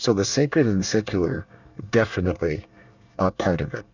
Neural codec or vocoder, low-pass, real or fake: codec, 24 kHz, 1 kbps, SNAC; 7.2 kHz; fake